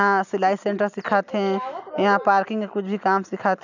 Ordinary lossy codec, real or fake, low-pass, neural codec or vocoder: none; real; 7.2 kHz; none